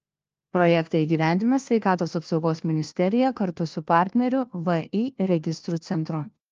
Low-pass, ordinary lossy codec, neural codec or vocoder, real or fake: 7.2 kHz; Opus, 32 kbps; codec, 16 kHz, 1 kbps, FunCodec, trained on LibriTTS, 50 frames a second; fake